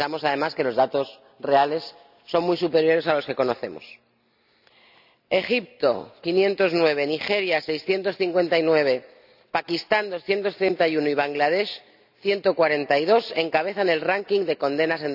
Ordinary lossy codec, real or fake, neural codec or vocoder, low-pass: none; real; none; 5.4 kHz